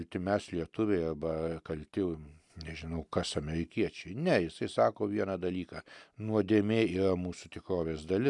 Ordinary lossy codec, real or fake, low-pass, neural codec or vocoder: MP3, 96 kbps; real; 10.8 kHz; none